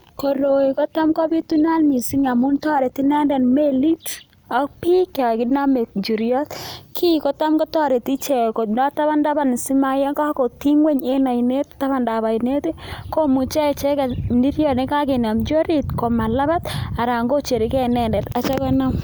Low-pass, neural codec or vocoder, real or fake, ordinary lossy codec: none; none; real; none